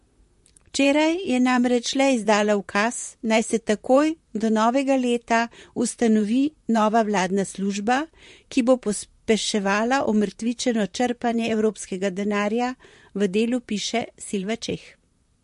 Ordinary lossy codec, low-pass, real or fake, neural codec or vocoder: MP3, 48 kbps; 14.4 kHz; fake; vocoder, 44.1 kHz, 128 mel bands, Pupu-Vocoder